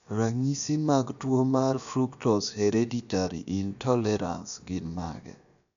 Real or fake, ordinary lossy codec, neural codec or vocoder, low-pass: fake; none; codec, 16 kHz, about 1 kbps, DyCAST, with the encoder's durations; 7.2 kHz